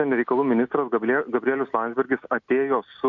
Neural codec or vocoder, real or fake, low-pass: none; real; 7.2 kHz